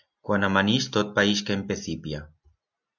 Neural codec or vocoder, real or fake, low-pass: none; real; 7.2 kHz